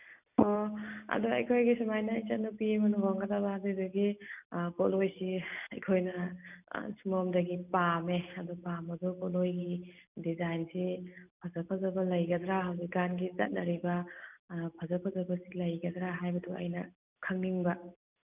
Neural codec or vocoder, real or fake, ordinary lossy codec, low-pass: none; real; none; 3.6 kHz